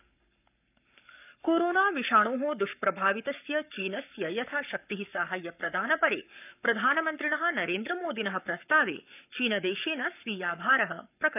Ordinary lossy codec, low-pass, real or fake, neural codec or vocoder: none; 3.6 kHz; fake; codec, 44.1 kHz, 7.8 kbps, Pupu-Codec